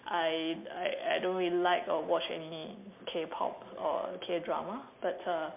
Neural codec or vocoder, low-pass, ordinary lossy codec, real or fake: none; 3.6 kHz; MP3, 32 kbps; real